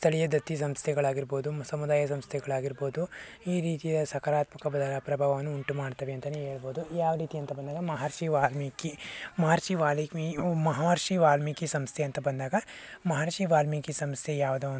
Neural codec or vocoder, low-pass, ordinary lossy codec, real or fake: none; none; none; real